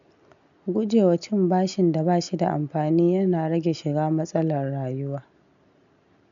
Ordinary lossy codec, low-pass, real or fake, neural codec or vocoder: MP3, 64 kbps; 7.2 kHz; real; none